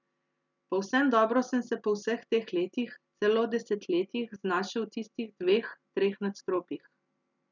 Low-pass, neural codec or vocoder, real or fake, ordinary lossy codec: 7.2 kHz; none; real; none